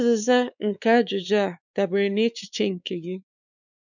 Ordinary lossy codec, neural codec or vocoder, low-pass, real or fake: none; codec, 16 kHz, 4 kbps, X-Codec, WavLM features, trained on Multilingual LibriSpeech; 7.2 kHz; fake